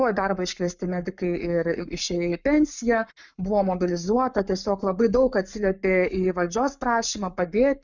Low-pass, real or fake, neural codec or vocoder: 7.2 kHz; fake; codec, 44.1 kHz, 7.8 kbps, Pupu-Codec